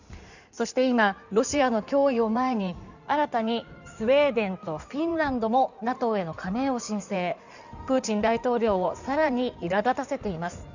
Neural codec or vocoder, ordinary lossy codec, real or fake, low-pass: codec, 16 kHz in and 24 kHz out, 2.2 kbps, FireRedTTS-2 codec; none; fake; 7.2 kHz